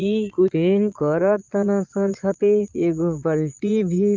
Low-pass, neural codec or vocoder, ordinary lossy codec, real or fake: 7.2 kHz; vocoder, 22.05 kHz, 80 mel bands, Vocos; Opus, 24 kbps; fake